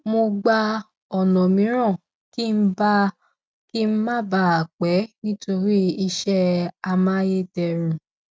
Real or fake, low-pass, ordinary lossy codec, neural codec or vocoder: real; none; none; none